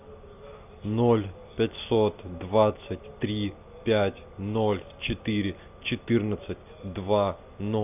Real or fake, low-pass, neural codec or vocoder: real; 3.6 kHz; none